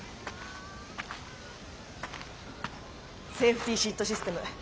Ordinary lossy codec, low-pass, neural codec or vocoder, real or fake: none; none; none; real